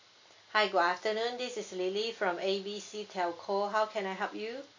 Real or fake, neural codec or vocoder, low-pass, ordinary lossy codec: real; none; 7.2 kHz; AAC, 48 kbps